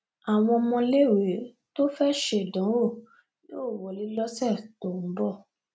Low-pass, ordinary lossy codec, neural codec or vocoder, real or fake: none; none; none; real